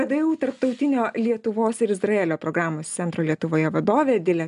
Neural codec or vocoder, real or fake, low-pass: none; real; 10.8 kHz